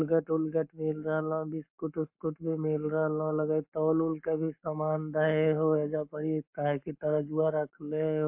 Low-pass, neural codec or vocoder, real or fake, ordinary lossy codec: 3.6 kHz; codec, 44.1 kHz, 7.8 kbps, Pupu-Codec; fake; MP3, 32 kbps